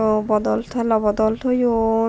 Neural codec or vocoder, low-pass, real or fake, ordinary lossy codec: none; none; real; none